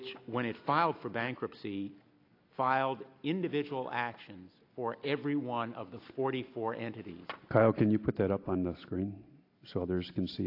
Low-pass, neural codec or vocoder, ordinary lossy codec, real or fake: 5.4 kHz; none; AAC, 32 kbps; real